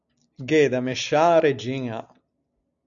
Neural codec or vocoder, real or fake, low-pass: none; real; 7.2 kHz